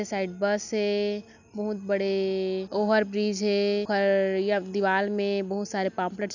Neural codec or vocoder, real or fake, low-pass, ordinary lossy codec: none; real; 7.2 kHz; none